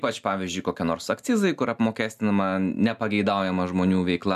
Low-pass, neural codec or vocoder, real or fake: 14.4 kHz; none; real